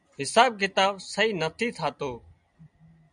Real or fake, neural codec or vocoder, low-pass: real; none; 9.9 kHz